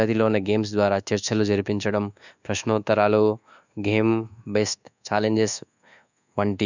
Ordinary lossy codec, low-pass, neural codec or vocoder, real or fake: none; 7.2 kHz; codec, 24 kHz, 1.2 kbps, DualCodec; fake